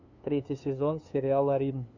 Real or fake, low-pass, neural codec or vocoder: fake; 7.2 kHz; codec, 16 kHz, 2 kbps, FunCodec, trained on LibriTTS, 25 frames a second